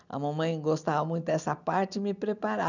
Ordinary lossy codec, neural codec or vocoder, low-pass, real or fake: none; none; 7.2 kHz; real